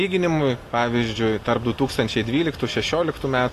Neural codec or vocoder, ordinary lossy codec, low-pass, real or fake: none; AAC, 48 kbps; 14.4 kHz; real